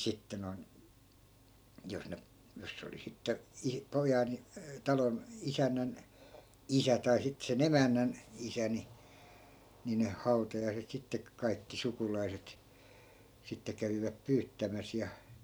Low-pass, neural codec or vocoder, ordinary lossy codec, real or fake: none; none; none; real